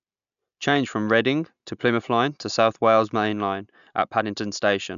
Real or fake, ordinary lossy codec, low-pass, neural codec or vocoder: real; none; 7.2 kHz; none